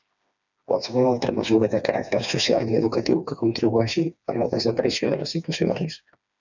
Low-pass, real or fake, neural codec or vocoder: 7.2 kHz; fake; codec, 16 kHz, 2 kbps, FreqCodec, smaller model